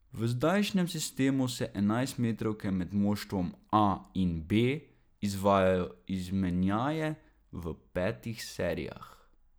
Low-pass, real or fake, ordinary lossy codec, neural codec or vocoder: none; real; none; none